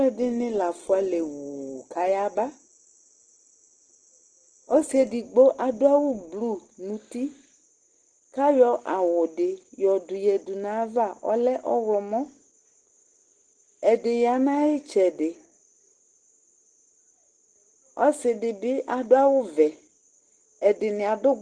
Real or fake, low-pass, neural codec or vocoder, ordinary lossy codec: real; 9.9 kHz; none; Opus, 16 kbps